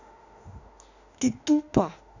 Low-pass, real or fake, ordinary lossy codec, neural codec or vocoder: 7.2 kHz; fake; none; autoencoder, 48 kHz, 32 numbers a frame, DAC-VAE, trained on Japanese speech